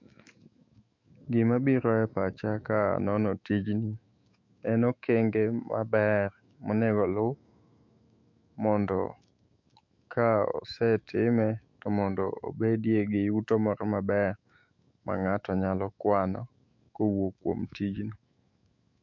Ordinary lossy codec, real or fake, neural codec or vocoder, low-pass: MP3, 48 kbps; fake; autoencoder, 48 kHz, 128 numbers a frame, DAC-VAE, trained on Japanese speech; 7.2 kHz